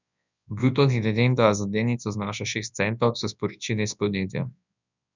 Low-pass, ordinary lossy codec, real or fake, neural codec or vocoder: 7.2 kHz; none; fake; codec, 24 kHz, 0.9 kbps, WavTokenizer, large speech release